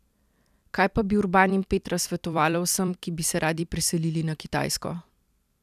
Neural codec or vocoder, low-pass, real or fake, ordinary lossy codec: vocoder, 44.1 kHz, 128 mel bands every 256 samples, BigVGAN v2; 14.4 kHz; fake; none